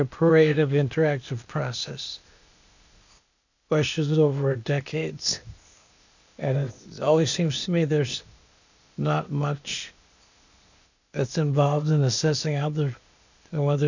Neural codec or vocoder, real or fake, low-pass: codec, 16 kHz, 0.8 kbps, ZipCodec; fake; 7.2 kHz